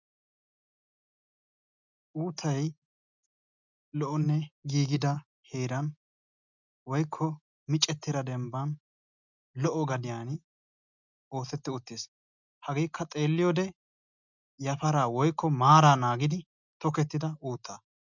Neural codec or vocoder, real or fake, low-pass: none; real; 7.2 kHz